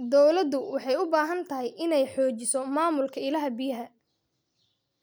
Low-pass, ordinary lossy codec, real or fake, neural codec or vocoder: none; none; real; none